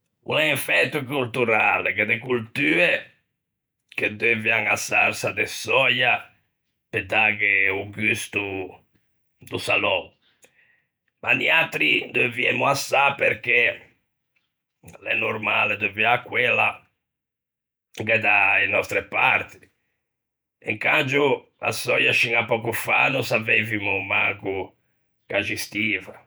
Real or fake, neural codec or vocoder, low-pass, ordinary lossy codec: fake; vocoder, 48 kHz, 128 mel bands, Vocos; none; none